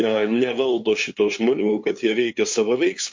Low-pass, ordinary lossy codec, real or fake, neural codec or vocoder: 7.2 kHz; MP3, 48 kbps; fake; codec, 16 kHz, 2 kbps, FunCodec, trained on LibriTTS, 25 frames a second